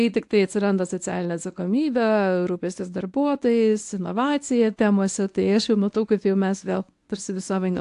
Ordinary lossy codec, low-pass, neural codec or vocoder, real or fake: AAC, 64 kbps; 10.8 kHz; codec, 24 kHz, 0.9 kbps, WavTokenizer, medium speech release version 1; fake